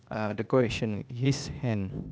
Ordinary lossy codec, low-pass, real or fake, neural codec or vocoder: none; none; fake; codec, 16 kHz, 0.8 kbps, ZipCodec